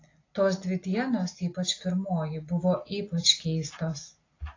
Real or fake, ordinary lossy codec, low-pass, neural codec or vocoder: real; AAC, 32 kbps; 7.2 kHz; none